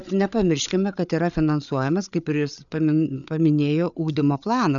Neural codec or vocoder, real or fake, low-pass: codec, 16 kHz, 8 kbps, FreqCodec, larger model; fake; 7.2 kHz